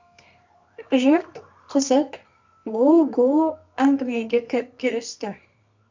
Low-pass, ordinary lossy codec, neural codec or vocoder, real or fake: 7.2 kHz; MP3, 64 kbps; codec, 24 kHz, 0.9 kbps, WavTokenizer, medium music audio release; fake